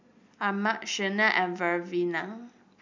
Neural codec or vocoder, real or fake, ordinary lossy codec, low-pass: none; real; MP3, 64 kbps; 7.2 kHz